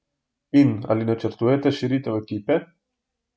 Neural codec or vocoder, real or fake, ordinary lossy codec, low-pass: none; real; none; none